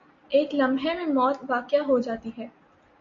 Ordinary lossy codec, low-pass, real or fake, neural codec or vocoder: AAC, 64 kbps; 7.2 kHz; real; none